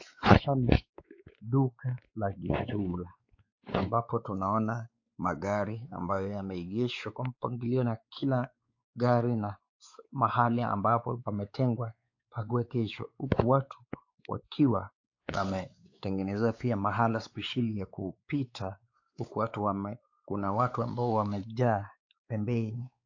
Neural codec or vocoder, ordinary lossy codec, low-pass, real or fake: codec, 16 kHz, 4 kbps, X-Codec, WavLM features, trained on Multilingual LibriSpeech; AAC, 48 kbps; 7.2 kHz; fake